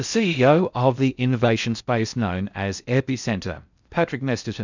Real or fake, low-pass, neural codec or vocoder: fake; 7.2 kHz; codec, 16 kHz in and 24 kHz out, 0.6 kbps, FocalCodec, streaming, 4096 codes